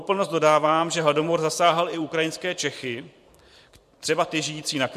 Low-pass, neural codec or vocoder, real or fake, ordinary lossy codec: 14.4 kHz; vocoder, 44.1 kHz, 128 mel bands every 512 samples, BigVGAN v2; fake; MP3, 64 kbps